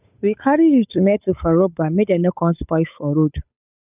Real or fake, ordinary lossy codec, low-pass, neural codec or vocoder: fake; none; 3.6 kHz; codec, 16 kHz, 8 kbps, FunCodec, trained on Chinese and English, 25 frames a second